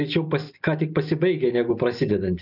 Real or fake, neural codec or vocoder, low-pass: real; none; 5.4 kHz